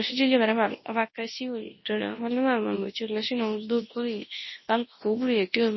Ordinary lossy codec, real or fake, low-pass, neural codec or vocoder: MP3, 24 kbps; fake; 7.2 kHz; codec, 24 kHz, 0.9 kbps, WavTokenizer, large speech release